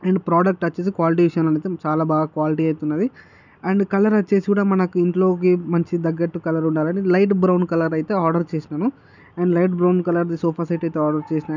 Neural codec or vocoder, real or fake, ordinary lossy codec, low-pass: none; real; none; 7.2 kHz